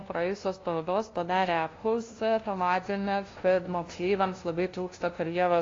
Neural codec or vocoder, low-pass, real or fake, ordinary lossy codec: codec, 16 kHz, 0.5 kbps, FunCodec, trained on LibriTTS, 25 frames a second; 7.2 kHz; fake; AAC, 32 kbps